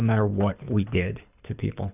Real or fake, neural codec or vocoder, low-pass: fake; codec, 24 kHz, 6 kbps, HILCodec; 3.6 kHz